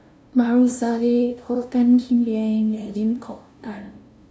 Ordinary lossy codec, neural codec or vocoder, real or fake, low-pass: none; codec, 16 kHz, 0.5 kbps, FunCodec, trained on LibriTTS, 25 frames a second; fake; none